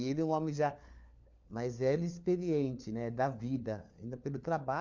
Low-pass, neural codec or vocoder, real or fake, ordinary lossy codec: 7.2 kHz; codec, 16 kHz, 4 kbps, FunCodec, trained on LibriTTS, 50 frames a second; fake; MP3, 64 kbps